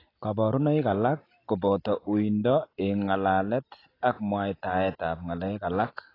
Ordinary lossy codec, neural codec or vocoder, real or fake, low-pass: AAC, 24 kbps; none; real; 5.4 kHz